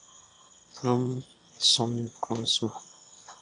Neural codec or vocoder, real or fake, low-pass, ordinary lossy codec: autoencoder, 22.05 kHz, a latent of 192 numbers a frame, VITS, trained on one speaker; fake; 9.9 kHz; AAC, 64 kbps